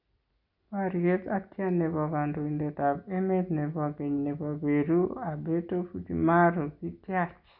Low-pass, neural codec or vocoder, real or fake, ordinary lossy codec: 5.4 kHz; none; real; none